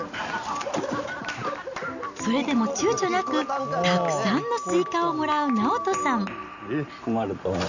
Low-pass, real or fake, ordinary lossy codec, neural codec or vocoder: 7.2 kHz; real; none; none